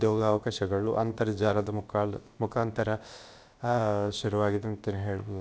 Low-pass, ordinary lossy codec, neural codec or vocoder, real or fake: none; none; codec, 16 kHz, about 1 kbps, DyCAST, with the encoder's durations; fake